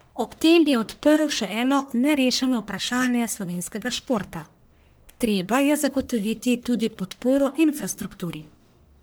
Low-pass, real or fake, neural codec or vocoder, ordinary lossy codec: none; fake; codec, 44.1 kHz, 1.7 kbps, Pupu-Codec; none